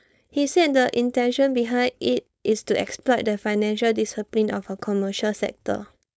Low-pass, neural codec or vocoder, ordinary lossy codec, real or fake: none; codec, 16 kHz, 4.8 kbps, FACodec; none; fake